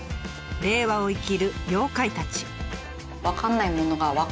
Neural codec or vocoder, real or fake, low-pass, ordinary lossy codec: none; real; none; none